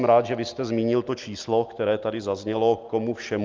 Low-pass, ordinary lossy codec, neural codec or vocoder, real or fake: 7.2 kHz; Opus, 32 kbps; none; real